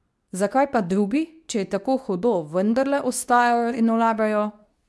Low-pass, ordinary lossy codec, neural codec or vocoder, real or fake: none; none; codec, 24 kHz, 0.9 kbps, WavTokenizer, medium speech release version 2; fake